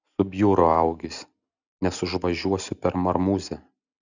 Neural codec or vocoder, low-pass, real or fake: none; 7.2 kHz; real